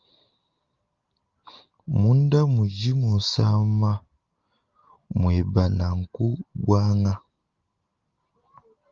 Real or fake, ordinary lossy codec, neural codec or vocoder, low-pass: real; Opus, 32 kbps; none; 7.2 kHz